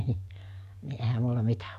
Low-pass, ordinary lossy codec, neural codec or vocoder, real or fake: 14.4 kHz; none; codec, 44.1 kHz, 7.8 kbps, DAC; fake